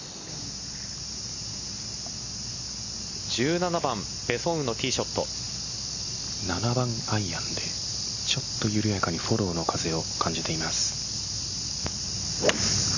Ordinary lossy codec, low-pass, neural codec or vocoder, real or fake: none; 7.2 kHz; none; real